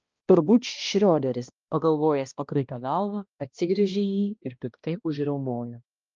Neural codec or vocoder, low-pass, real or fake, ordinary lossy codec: codec, 16 kHz, 1 kbps, X-Codec, HuBERT features, trained on balanced general audio; 7.2 kHz; fake; Opus, 24 kbps